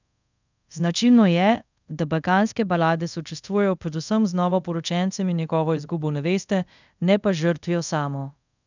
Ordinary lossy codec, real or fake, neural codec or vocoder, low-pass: none; fake; codec, 24 kHz, 0.5 kbps, DualCodec; 7.2 kHz